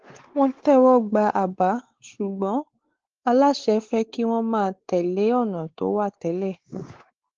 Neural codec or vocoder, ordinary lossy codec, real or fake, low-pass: codec, 16 kHz, 4 kbps, X-Codec, WavLM features, trained on Multilingual LibriSpeech; Opus, 16 kbps; fake; 7.2 kHz